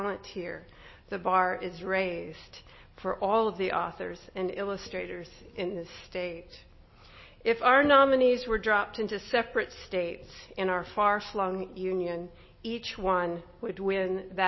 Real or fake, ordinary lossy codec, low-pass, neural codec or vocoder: real; MP3, 24 kbps; 7.2 kHz; none